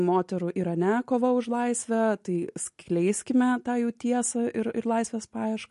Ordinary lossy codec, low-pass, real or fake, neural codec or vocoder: MP3, 48 kbps; 14.4 kHz; real; none